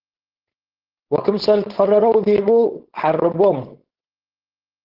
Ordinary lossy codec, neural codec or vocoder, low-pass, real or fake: Opus, 16 kbps; codec, 16 kHz, 4.8 kbps, FACodec; 5.4 kHz; fake